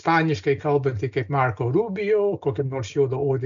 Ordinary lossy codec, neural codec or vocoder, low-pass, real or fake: MP3, 64 kbps; none; 7.2 kHz; real